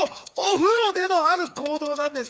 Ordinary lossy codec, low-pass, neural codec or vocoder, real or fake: none; none; codec, 16 kHz, 2 kbps, FreqCodec, larger model; fake